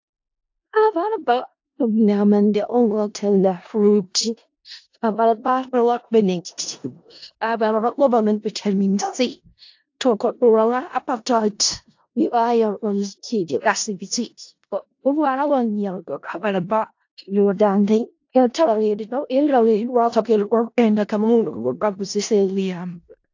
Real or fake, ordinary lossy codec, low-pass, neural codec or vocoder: fake; AAC, 48 kbps; 7.2 kHz; codec, 16 kHz in and 24 kHz out, 0.4 kbps, LongCat-Audio-Codec, four codebook decoder